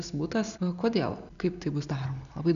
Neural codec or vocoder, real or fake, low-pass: none; real; 7.2 kHz